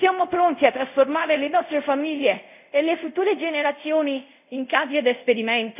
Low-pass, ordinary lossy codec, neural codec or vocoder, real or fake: 3.6 kHz; none; codec, 24 kHz, 0.5 kbps, DualCodec; fake